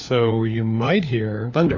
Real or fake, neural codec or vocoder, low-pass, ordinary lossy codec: fake; codec, 16 kHz, 4 kbps, FunCodec, trained on LibriTTS, 50 frames a second; 7.2 kHz; AAC, 48 kbps